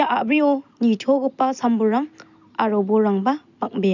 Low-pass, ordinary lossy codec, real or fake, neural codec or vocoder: 7.2 kHz; none; real; none